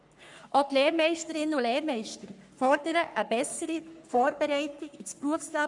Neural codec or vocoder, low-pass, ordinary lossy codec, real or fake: codec, 44.1 kHz, 3.4 kbps, Pupu-Codec; 10.8 kHz; none; fake